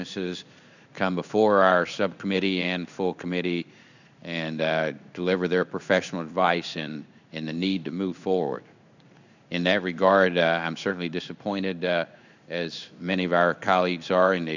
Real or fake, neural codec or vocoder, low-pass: fake; codec, 16 kHz in and 24 kHz out, 1 kbps, XY-Tokenizer; 7.2 kHz